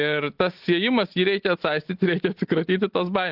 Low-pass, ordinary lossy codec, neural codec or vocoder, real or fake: 5.4 kHz; Opus, 16 kbps; none; real